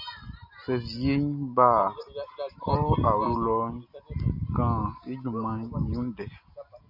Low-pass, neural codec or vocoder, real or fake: 5.4 kHz; none; real